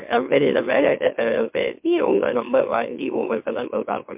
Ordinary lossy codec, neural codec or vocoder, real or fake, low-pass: MP3, 32 kbps; autoencoder, 44.1 kHz, a latent of 192 numbers a frame, MeloTTS; fake; 3.6 kHz